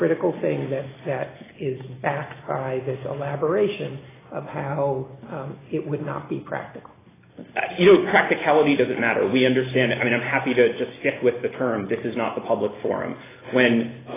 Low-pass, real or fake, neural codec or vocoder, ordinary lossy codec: 3.6 kHz; real; none; AAC, 16 kbps